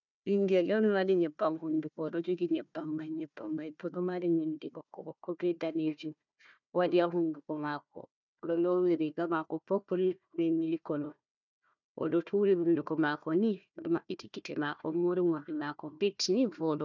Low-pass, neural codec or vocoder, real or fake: 7.2 kHz; codec, 16 kHz, 1 kbps, FunCodec, trained on Chinese and English, 50 frames a second; fake